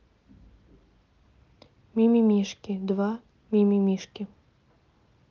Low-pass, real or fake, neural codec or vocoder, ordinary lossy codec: 7.2 kHz; real; none; Opus, 32 kbps